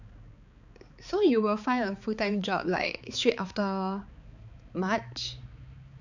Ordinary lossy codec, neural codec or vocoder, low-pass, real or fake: none; codec, 16 kHz, 4 kbps, X-Codec, HuBERT features, trained on balanced general audio; 7.2 kHz; fake